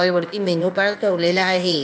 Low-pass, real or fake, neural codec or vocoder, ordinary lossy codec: none; fake; codec, 16 kHz, 0.8 kbps, ZipCodec; none